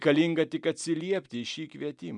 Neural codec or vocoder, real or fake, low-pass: none; real; 10.8 kHz